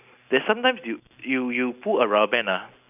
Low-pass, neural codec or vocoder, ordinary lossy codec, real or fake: 3.6 kHz; none; none; real